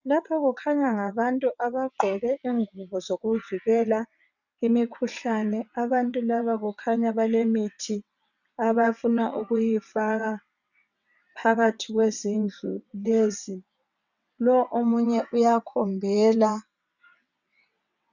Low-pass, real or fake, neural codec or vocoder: 7.2 kHz; fake; vocoder, 22.05 kHz, 80 mel bands, WaveNeXt